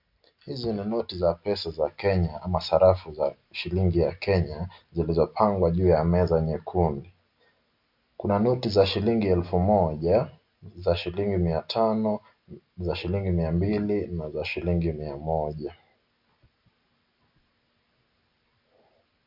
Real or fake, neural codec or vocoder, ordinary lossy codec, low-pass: real; none; MP3, 48 kbps; 5.4 kHz